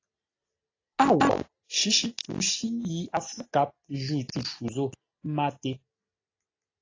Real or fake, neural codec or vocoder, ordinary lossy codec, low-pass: real; none; AAC, 32 kbps; 7.2 kHz